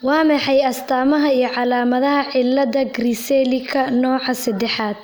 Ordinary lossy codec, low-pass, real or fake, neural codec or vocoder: none; none; real; none